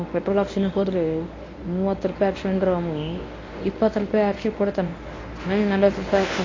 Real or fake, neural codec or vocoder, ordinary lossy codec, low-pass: fake; codec, 24 kHz, 0.9 kbps, WavTokenizer, medium speech release version 1; AAC, 32 kbps; 7.2 kHz